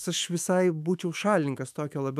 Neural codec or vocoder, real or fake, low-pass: autoencoder, 48 kHz, 128 numbers a frame, DAC-VAE, trained on Japanese speech; fake; 14.4 kHz